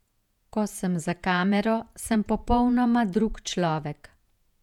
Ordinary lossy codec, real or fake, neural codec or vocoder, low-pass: none; fake; vocoder, 48 kHz, 128 mel bands, Vocos; 19.8 kHz